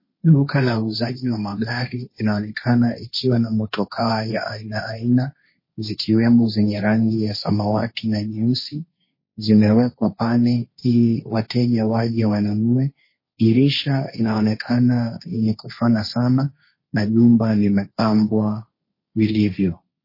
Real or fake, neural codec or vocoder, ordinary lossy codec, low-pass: fake; codec, 16 kHz, 1.1 kbps, Voila-Tokenizer; MP3, 24 kbps; 5.4 kHz